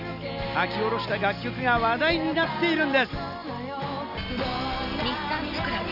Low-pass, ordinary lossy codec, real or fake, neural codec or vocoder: 5.4 kHz; none; real; none